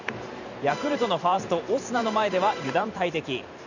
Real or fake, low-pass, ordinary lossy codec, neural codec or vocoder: fake; 7.2 kHz; none; vocoder, 44.1 kHz, 128 mel bands every 512 samples, BigVGAN v2